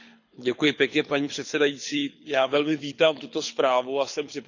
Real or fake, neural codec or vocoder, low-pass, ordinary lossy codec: fake; codec, 24 kHz, 6 kbps, HILCodec; 7.2 kHz; none